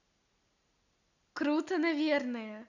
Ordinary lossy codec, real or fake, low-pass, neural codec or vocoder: none; real; 7.2 kHz; none